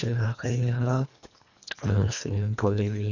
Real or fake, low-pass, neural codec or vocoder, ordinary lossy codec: fake; 7.2 kHz; codec, 24 kHz, 1.5 kbps, HILCodec; none